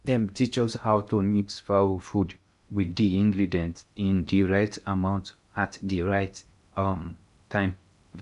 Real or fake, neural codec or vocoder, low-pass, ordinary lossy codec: fake; codec, 16 kHz in and 24 kHz out, 0.6 kbps, FocalCodec, streaming, 2048 codes; 10.8 kHz; none